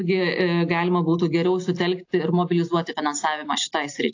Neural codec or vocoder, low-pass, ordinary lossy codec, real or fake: none; 7.2 kHz; AAC, 48 kbps; real